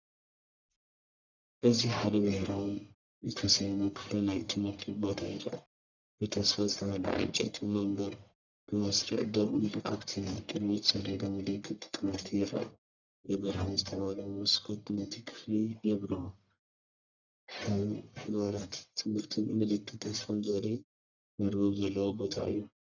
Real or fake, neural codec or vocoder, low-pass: fake; codec, 44.1 kHz, 1.7 kbps, Pupu-Codec; 7.2 kHz